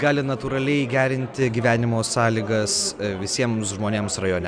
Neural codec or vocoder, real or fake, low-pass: none; real; 9.9 kHz